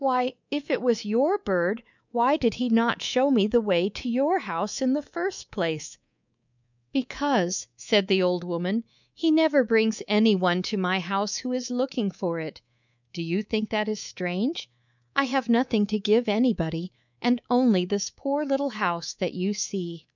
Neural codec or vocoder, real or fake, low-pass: codec, 24 kHz, 3.1 kbps, DualCodec; fake; 7.2 kHz